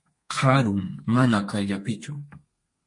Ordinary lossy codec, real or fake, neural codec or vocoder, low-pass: MP3, 48 kbps; fake; codec, 32 kHz, 1.9 kbps, SNAC; 10.8 kHz